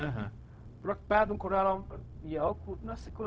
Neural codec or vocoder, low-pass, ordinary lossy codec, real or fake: codec, 16 kHz, 0.4 kbps, LongCat-Audio-Codec; none; none; fake